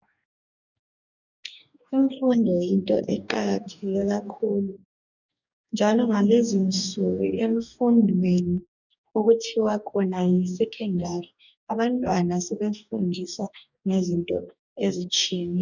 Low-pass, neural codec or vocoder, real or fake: 7.2 kHz; codec, 44.1 kHz, 2.6 kbps, DAC; fake